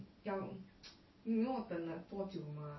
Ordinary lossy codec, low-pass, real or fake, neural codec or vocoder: MP3, 24 kbps; 7.2 kHz; real; none